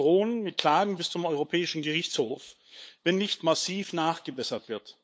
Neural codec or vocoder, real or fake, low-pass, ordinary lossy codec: codec, 16 kHz, 8 kbps, FunCodec, trained on LibriTTS, 25 frames a second; fake; none; none